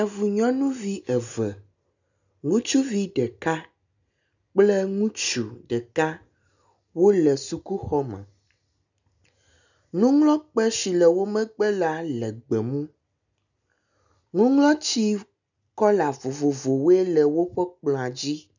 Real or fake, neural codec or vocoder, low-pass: real; none; 7.2 kHz